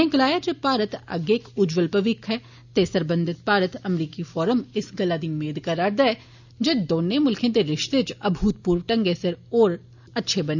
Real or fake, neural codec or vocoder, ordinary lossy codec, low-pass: real; none; none; 7.2 kHz